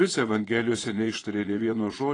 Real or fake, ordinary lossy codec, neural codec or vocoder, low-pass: fake; AAC, 32 kbps; vocoder, 22.05 kHz, 80 mel bands, Vocos; 9.9 kHz